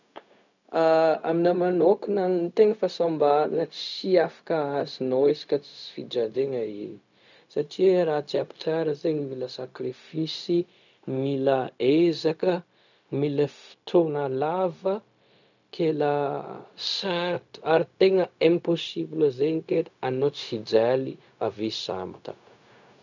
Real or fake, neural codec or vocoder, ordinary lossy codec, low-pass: fake; codec, 16 kHz, 0.4 kbps, LongCat-Audio-Codec; none; 7.2 kHz